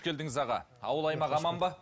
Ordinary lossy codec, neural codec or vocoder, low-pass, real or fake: none; none; none; real